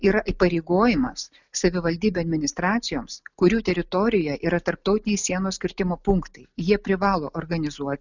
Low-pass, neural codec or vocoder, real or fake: 7.2 kHz; none; real